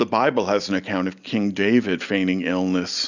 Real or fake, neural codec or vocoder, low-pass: real; none; 7.2 kHz